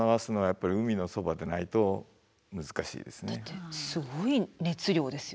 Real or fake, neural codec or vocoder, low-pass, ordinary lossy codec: real; none; none; none